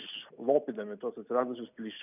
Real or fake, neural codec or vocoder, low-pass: real; none; 3.6 kHz